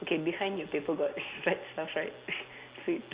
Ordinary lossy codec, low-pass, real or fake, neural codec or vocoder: Opus, 64 kbps; 3.6 kHz; real; none